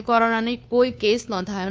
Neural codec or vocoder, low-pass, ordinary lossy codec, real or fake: codec, 16 kHz, 2 kbps, FunCodec, trained on Chinese and English, 25 frames a second; none; none; fake